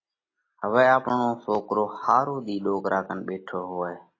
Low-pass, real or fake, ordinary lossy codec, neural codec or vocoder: 7.2 kHz; real; AAC, 32 kbps; none